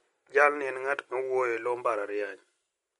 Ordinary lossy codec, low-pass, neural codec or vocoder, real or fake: MP3, 48 kbps; 19.8 kHz; none; real